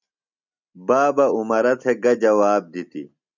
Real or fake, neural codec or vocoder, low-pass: real; none; 7.2 kHz